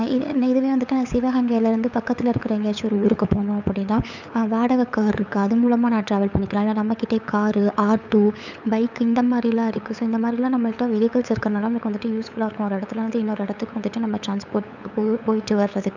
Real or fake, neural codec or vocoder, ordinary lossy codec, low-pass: fake; codec, 16 kHz, 8 kbps, FunCodec, trained on LibriTTS, 25 frames a second; none; 7.2 kHz